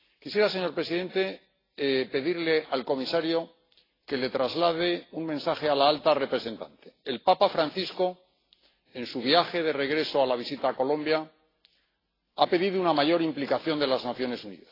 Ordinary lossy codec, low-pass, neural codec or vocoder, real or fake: AAC, 24 kbps; 5.4 kHz; none; real